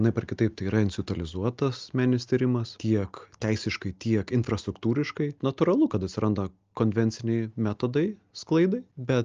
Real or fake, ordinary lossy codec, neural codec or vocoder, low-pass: real; Opus, 24 kbps; none; 7.2 kHz